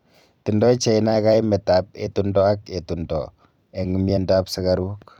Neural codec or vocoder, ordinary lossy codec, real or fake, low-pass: vocoder, 44.1 kHz, 128 mel bands every 256 samples, BigVGAN v2; none; fake; 19.8 kHz